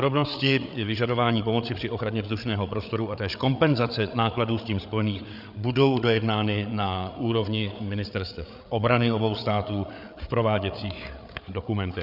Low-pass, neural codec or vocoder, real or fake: 5.4 kHz; codec, 16 kHz, 8 kbps, FreqCodec, larger model; fake